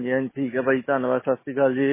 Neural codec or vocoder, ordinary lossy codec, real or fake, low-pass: none; MP3, 16 kbps; real; 3.6 kHz